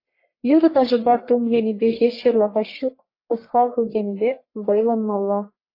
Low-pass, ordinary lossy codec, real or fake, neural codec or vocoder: 5.4 kHz; AAC, 32 kbps; fake; codec, 44.1 kHz, 1.7 kbps, Pupu-Codec